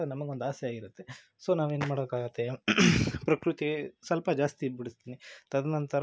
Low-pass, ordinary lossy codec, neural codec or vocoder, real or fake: none; none; none; real